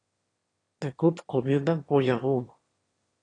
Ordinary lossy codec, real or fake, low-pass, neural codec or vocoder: AAC, 32 kbps; fake; 9.9 kHz; autoencoder, 22.05 kHz, a latent of 192 numbers a frame, VITS, trained on one speaker